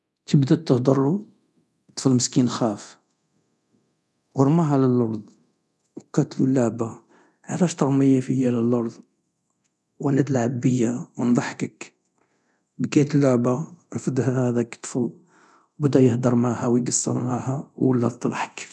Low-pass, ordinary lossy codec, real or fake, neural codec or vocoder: none; none; fake; codec, 24 kHz, 0.9 kbps, DualCodec